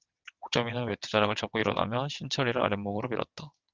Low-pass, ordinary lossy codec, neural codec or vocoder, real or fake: 7.2 kHz; Opus, 32 kbps; vocoder, 22.05 kHz, 80 mel bands, WaveNeXt; fake